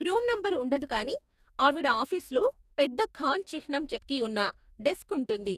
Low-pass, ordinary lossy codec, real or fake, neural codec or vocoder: 14.4 kHz; none; fake; codec, 44.1 kHz, 2.6 kbps, DAC